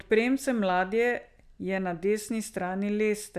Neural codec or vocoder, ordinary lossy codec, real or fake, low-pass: none; none; real; 14.4 kHz